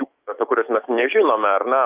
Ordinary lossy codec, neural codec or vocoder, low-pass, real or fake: Opus, 24 kbps; autoencoder, 48 kHz, 128 numbers a frame, DAC-VAE, trained on Japanese speech; 3.6 kHz; fake